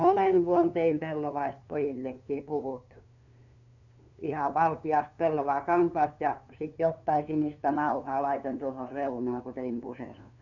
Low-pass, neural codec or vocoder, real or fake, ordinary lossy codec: 7.2 kHz; codec, 16 kHz, 2 kbps, FunCodec, trained on LibriTTS, 25 frames a second; fake; none